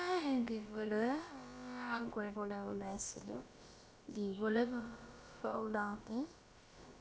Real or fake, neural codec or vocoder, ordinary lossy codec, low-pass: fake; codec, 16 kHz, about 1 kbps, DyCAST, with the encoder's durations; none; none